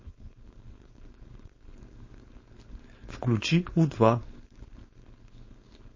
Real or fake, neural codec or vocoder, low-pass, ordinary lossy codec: fake; codec, 16 kHz, 4.8 kbps, FACodec; 7.2 kHz; MP3, 32 kbps